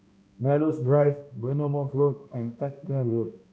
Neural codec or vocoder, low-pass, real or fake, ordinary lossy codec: codec, 16 kHz, 1 kbps, X-Codec, HuBERT features, trained on balanced general audio; none; fake; none